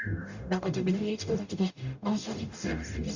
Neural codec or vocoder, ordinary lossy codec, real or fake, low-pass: codec, 44.1 kHz, 0.9 kbps, DAC; Opus, 64 kbps; fake; 7.2 kHz